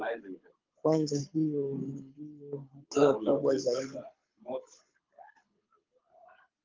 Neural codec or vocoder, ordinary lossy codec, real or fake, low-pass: codec, 24 kHz, 6 kbps, HILCodec; Opus, 24 kbps; fake; 7.2 kHz